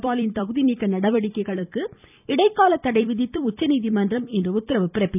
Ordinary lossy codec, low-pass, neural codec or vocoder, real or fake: none; 3.6 kHz; vocoder, 44.1 kHz, 128 mel bands every 256 samples, BigVGAN v2; fake